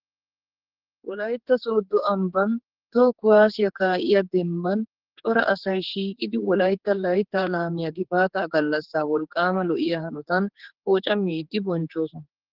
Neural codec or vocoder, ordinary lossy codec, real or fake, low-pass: codec, 16 kHz, 4 kbps, X-Codec, HuBERT features, trained on general audio; Opus, 16 kbps; fake; 5.4 kHz